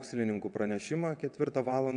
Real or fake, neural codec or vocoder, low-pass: fake; vocoder, 22.05 kHz, 80 mel bands, WaveNeXt; 9.9 kHz